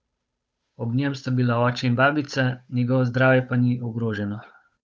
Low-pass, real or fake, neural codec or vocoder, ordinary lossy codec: none; fake; codec, 16 kHz, 8 kbps, FunCodec, trained on Chinese and English, 25 frames a second; none